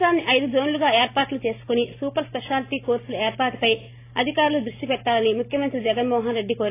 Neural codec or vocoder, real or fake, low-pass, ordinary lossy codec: none; real; 3.6 kHz; AAC, 24 kbps